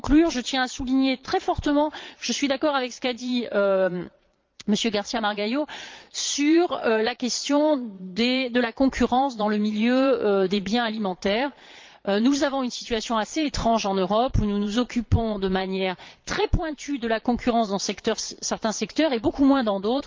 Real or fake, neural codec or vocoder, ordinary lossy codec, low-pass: fake; vocoder, 22.05 kHz, 80 mel bands, Vocos; Opus, 24 kbps; 7.2 kHz